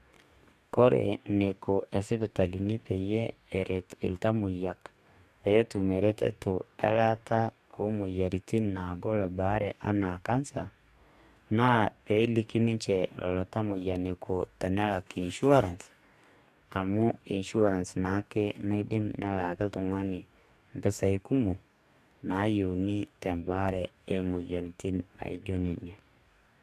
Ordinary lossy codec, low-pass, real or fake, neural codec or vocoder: AAC, 96 kbps; 14.4 kHz; fake; codec, 44.1 kHz, 2.6 kbps, DAC